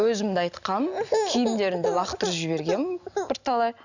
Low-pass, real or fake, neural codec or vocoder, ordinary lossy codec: 7.2 kHz; real; none; none